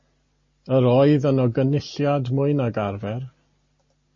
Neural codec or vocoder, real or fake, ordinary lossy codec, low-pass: none; real; MP3, 32 kbps; 7.2 kHz